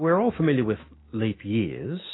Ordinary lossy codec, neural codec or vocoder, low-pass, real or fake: AAC, 16 kbps; autoencoder, 48 kHz, 128 numbers a frame, DAC-VAE, trained on Japanese speech; 7.2 kHz; fake